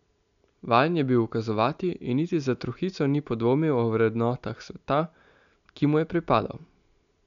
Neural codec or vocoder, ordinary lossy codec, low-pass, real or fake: none; none; 7.2 kHz; real